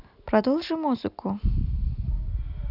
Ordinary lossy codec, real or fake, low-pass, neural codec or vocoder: none; real; 5.4 kHz; none